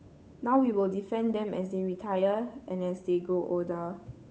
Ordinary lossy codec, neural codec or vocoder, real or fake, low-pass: none; codec, 16 kHz, 8 kbps, FunCodec, trained on Chinese and English, 25 frames a second; fake; none